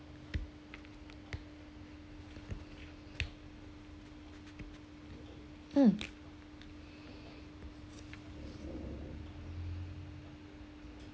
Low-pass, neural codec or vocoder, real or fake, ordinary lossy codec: none; none; real; none